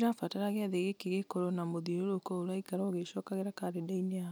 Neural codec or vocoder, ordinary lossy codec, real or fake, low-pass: none; none; real; none